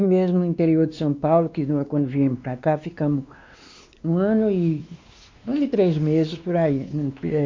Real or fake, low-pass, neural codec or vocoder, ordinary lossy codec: fake; 7.2 kHz; codec, 16 kHz, 2 kbps, X-Codec, WavLM features, trained on Multilingual LibriSpeech; MP3, 48 kbps